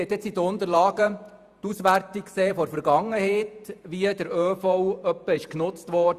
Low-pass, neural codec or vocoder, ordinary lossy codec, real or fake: 14.4 kHz; vocoder, 48 kHz, 128 mel bands, Vocos; Opus, 64 kbps; fake